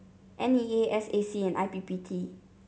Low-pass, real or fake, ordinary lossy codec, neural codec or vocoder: none; real; none; none